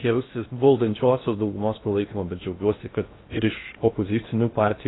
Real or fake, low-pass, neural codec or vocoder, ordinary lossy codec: fake; 7.2 kHz; codec, 16 kHz in and 24 kHz out, 0.6 kbps, FocalCodec, streaming, 2048 codes; AAC, 16 kbps